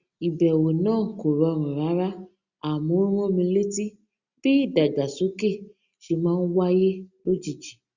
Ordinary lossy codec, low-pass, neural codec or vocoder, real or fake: Opus, 64 kbps; 7.2 kHz; none; real